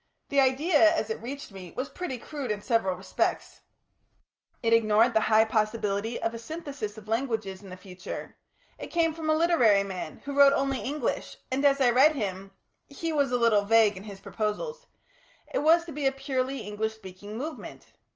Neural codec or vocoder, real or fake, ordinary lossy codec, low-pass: none; real; Opus, 24 kbps; 7.2 kHz